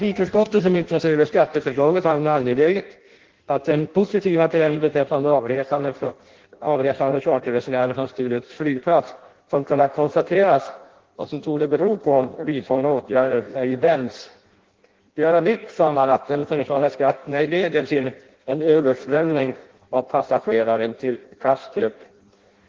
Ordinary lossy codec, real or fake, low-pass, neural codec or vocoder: Opus, 16 kbps; fake; 7.2 kHz; codec, 16 kHz in and 24 kHz out, 0.6 kbps, FireRedTTS-2 codec